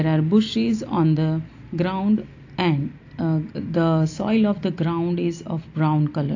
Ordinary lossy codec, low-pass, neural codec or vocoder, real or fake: AAC, 48 kbps; 7.2 kHz; none; real